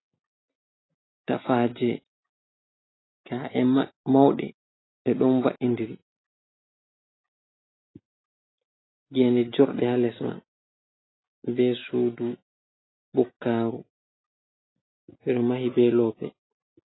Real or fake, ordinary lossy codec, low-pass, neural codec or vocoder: real; AAC, 16 kbps; 7.2 kHz; none